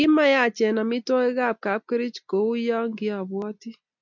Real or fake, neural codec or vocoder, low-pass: real; none; 7.2 kHz